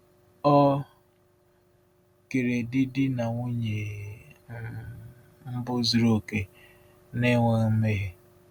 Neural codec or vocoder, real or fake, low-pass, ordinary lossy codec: none; real; 19.8 kHz; none